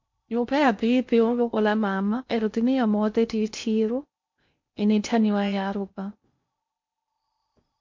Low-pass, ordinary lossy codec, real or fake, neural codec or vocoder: 7.2 kHz; MP3, 48 kbps; fake; codec, 16 kHz in and 24 kHz out, 0.6 kbps, FocalCodec, streaming, 2048 codes